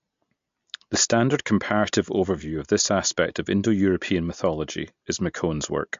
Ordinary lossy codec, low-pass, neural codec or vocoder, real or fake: MP3, 48 kbps; 7.2 kHz; none; real